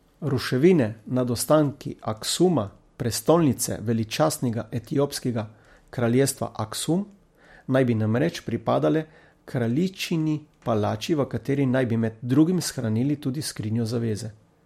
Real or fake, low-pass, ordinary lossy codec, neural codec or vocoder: real; 19.8 kHz; MP3, 64 kbps; none